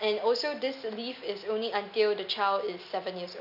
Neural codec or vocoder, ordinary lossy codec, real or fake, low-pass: none; none; real; 5.4 kHz